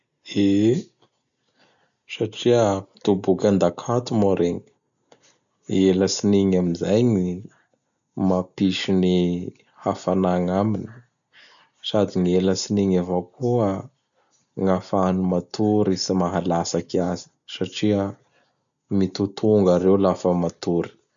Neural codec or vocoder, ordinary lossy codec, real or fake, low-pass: none; none; real; 7.2 kHz